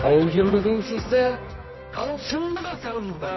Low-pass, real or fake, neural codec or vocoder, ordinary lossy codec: 7.2 kHz; fake; codec, 24 kHz, 0.9 kbps, WavTokenizer, medium music audio release; MP3, 24 kbps